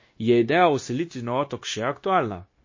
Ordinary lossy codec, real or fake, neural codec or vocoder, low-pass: MP3, 32 kbps; fake; codec, 16 kHz, 0.9 kbps, LongCat-Audio-Codec; 7.2 kHz